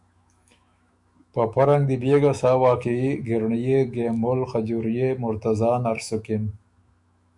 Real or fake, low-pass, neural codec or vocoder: fake; 10.8 kHz; autoencoder, 48 kHz, 128 numbers a frame, DAC-VAE, trained on Japanese speech